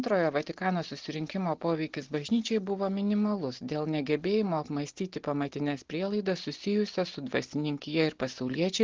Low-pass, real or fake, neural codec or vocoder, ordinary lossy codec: 7.2 kHz; real; none; Opus, 16 kbps